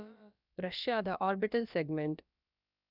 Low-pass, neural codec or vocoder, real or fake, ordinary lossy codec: 5.4 kHz; codec, 16 kHz, about 1 kbps, DyCAST, with the encoder's durations; fake; none